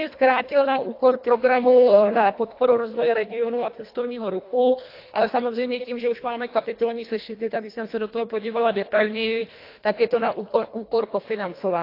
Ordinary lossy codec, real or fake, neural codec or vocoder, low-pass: AAC, 32 kbps; fake; codec, 24 kHz, 1.5 kbps, HILCodec; 5.4 kHz